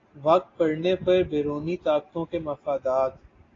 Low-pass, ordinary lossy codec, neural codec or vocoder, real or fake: 7.2 kHz; AAC, 32 kbps; none; real